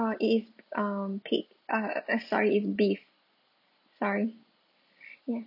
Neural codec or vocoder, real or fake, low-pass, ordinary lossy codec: none; real; 5.4 kHz; none